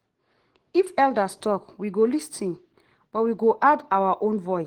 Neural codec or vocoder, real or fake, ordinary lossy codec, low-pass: codec, 44.1 kHz, 7.8 kbps, DAC; fake; Opus, 16 kbps; 19.8 kHz